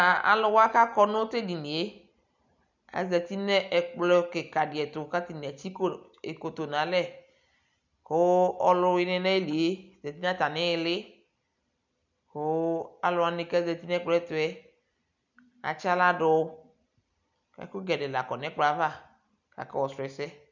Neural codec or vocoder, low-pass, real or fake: none; 7.2 kHz; real